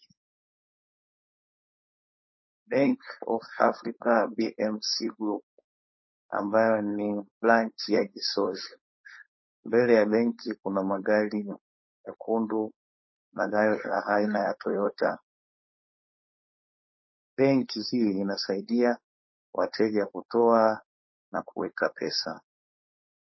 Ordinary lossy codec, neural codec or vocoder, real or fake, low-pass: MP3, 24 kbps; codec, 16 kHz, 4.8 kbps, FACodec; fake; 7.2 kHz